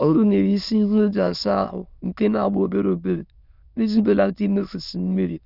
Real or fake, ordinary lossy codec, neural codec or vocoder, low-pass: fake; none; autoencoder, 22.05 kHz, a latent of 192 numbers a frame, VITS, trained on many speakers; 5.4 kHz